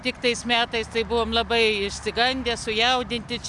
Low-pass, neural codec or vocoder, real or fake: 10.8 kHz; none; real